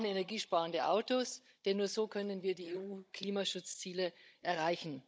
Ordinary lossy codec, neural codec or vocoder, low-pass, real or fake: none; codec, 16 kHz, 16 kbps, FunCodec, trained on Chinese and English, 50 frames a second; none; fake